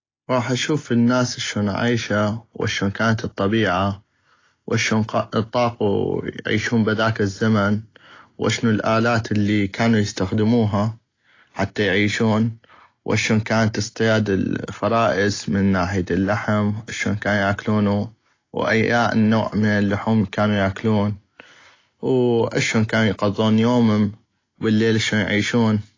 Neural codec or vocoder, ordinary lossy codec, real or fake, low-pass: none; AAC, 32 kbps; real; 7.2 kHz